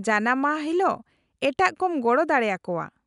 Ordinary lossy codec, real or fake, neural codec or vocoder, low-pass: none; real; none; 10.8 kHz